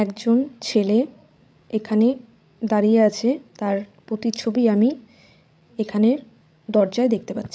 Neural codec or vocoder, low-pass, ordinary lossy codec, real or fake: codec, 16 kHz, 16 kbps, FreqCodec, larger model; none; none; fake